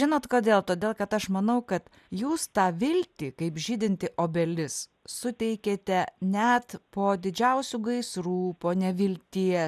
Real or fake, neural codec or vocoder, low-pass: real; none; 14.4 kHz